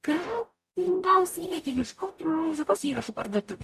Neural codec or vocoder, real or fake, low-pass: codec, 44.1 kHz, 0.9 kbps, DAC; fake; 14.4 kHz